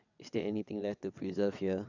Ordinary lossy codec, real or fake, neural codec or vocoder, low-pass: none; fake; vocoder, 22.05 kHz, 80 mel bands, WaveNeXt; 7.2 kHz